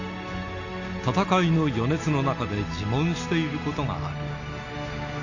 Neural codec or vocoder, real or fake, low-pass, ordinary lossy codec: none; real; 7.2 kHz; none